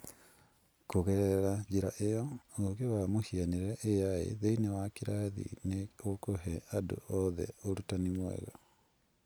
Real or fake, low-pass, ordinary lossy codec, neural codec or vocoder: real; none; none; none